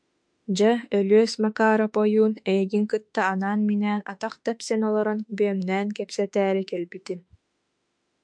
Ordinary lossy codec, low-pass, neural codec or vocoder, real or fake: MP3, 64 kbps; 9.9 kHz; autoencoder, 48 kHz, 32 numbers a frame, DAC-VAE, trained on Japanese speech; fake